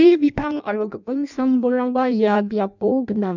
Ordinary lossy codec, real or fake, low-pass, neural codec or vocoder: none; fake; 7.2 kHz; codec, 16 kHz in and 24 kHz out, 0.6 kbps, FireRedTTS-2 codec